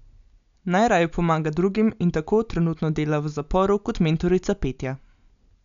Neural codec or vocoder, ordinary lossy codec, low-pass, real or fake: none; none; 7.2 kHz; real